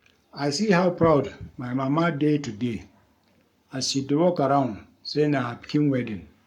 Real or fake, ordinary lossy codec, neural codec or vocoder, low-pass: fake; MP3, 96 kbps; codec, 44.1 kHz, 7.8 kbps, Pupu-Codec; 19.8 kHz